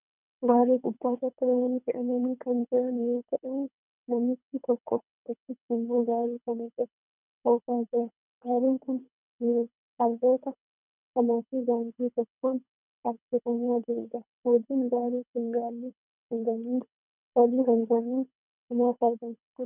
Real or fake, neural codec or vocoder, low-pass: fake; codec, 24 kHz, 3 kbps, HILCodec; 3.6 kHz